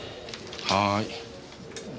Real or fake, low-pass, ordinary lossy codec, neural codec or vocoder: real; none; none; none